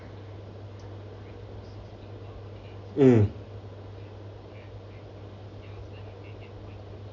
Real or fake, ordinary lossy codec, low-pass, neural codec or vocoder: real; none; 7.2 kHz; none